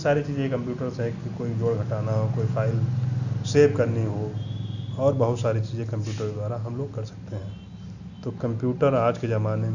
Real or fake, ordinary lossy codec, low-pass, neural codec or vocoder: real; none; 7.2 kHz; none